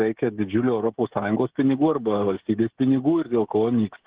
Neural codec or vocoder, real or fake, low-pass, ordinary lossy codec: codec, 16 kHz, 16 kbps, FreqCodec, smaller model; fake; 3.6 kHz; Opus, 16 kbps